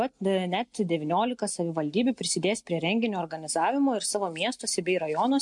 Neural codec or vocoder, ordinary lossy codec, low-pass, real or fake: none; MP3, 64 kbps; 10.8 kHz; real